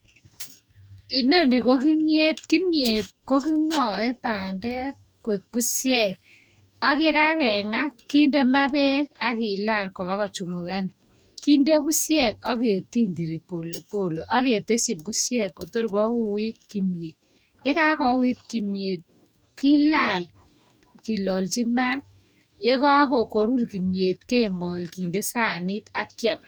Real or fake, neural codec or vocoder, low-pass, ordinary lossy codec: fake; codec, 44.1 kHz, 2.6 kbps, DAC; none; none